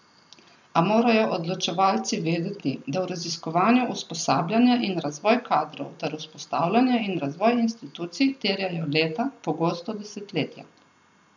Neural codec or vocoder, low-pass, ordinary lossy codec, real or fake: none; 7.2 kHz; none; real